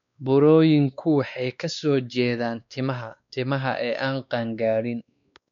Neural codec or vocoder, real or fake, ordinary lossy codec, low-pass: codec, 16 kHz, 2 kbps, X-Codec, WavLM features, trained on Multilingual LibriSpeech; fake; MP3, 64 kbps; 7.2 kHz